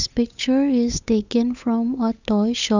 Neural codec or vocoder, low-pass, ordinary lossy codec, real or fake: codec, 16 kHz, 8 kbps, FunCodec, trained on Chinese and English, 25 frames a second; 7.2 kHz; none; fake